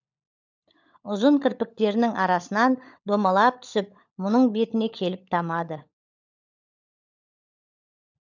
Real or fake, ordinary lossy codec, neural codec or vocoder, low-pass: fake; none; codec, 16 kHz, 16 kbps, FunCodec, trained on LibriTTS, 50 frames a second; 7.2 kHz